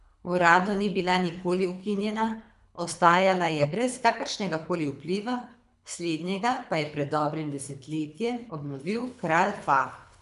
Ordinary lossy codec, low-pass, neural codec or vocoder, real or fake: none; 10.8 kHz; codec, 24 kHz, 3 kbps, HILCodec; fake